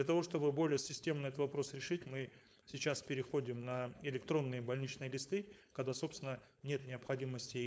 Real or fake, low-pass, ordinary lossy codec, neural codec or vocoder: fake; none; none; codec, 16 kHz, 4.8 kbps, FACodec